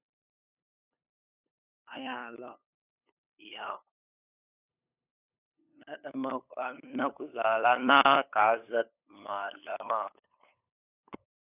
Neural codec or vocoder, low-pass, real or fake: codec, 16 kHz, 8 kbps, FunCodec, trained on LibriTTS, 25 frames a second; 3.6 kHz; fake